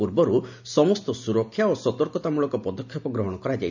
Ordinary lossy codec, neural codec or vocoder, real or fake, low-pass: none; none; real; 7.2 kHz